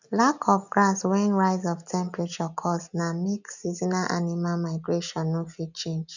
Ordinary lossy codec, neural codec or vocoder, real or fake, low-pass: none; none; real; 7.2 kHz